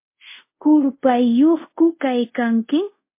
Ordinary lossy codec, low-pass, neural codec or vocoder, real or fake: MP3, 24 kbps; 3.6 kHz; codec, 24 kHz, 0.5 kbps, DualCodec; fake